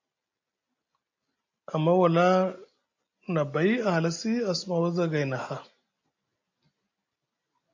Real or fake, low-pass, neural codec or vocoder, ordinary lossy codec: real; 7.2 kHz; none; AAC, 48 kbps